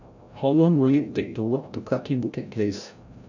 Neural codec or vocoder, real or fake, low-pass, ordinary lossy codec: codec, 16 kHz, 0.5 kbps, FreqCodec, larger model; fake; 7.2 kHz; none